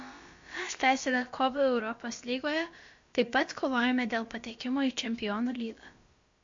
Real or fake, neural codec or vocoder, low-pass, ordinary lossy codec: fake; codec, 16 kHz, about 1 kbps, DyCAST, with the encoder's durations; 7.2 kHz; MP3, 48 kbps